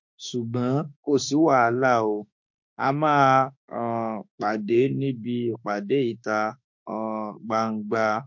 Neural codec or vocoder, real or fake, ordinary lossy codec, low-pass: autoencoder, 48 kHz, 32 numbers a frame, DAC-VAE, trained on Japanese speech; fake; MP3, 48 kbps; 7.2 kHz